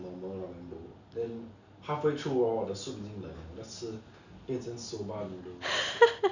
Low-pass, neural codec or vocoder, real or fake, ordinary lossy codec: 7.2 kHz; none; real; none